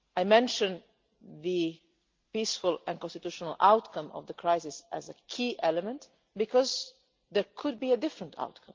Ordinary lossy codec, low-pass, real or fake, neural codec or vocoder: Opus, 32 kbps; 7.2 kHz; real; none